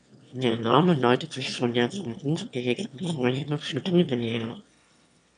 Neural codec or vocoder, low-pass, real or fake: autoencoder, 22.05 kHz, a latent of 192 numbers a frame, VITS, trained on one speaker; 9.9 kHz; fake